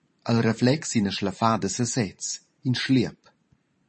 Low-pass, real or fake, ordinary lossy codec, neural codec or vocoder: 10.8 kHz; fake; MP3, 32 kbps; vocoder, 44.1 kHz, 128 mel bands every 512 samples, BigVGAN v2